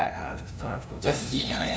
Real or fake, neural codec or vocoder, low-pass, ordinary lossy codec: fake; codec, 16 kHz, 0.5 kbps, FunCodec, trained on LibriTTS, 25 frames a second; none; none